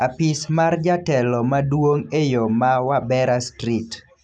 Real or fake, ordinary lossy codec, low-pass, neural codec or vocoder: real; none; 9.9 kHz; none